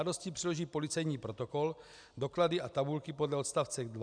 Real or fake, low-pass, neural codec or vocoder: real; 9.9 kHz; none